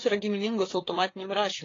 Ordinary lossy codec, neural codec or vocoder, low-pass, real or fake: AAC, 32 kbps; codec, 16 kHz, 4 kbps, FreqCodec, larger model; 7.2 kHz; fake